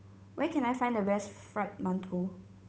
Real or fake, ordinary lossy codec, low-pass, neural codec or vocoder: fake; none; none; codec, 16 kHz, 8 kbps, FunCodec, trained on Chinese and English, 25 frames a second